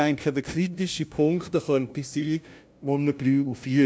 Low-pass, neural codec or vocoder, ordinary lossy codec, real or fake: none; codec, 16 kHz, 0.5 kbps, FunCodec, trained on LibriTTS, 25 frames a second; none; fake